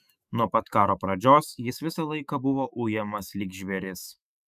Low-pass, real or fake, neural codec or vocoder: 14.4 kHz; fake; autoencoder, 48 kHz, 128 numbers a frame, DAC-VAE, trained on Japanese speech